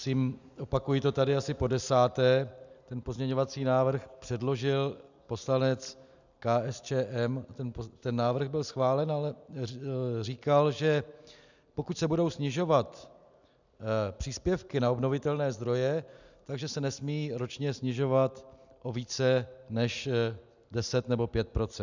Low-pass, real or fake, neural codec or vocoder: 7.2 kHz; real; none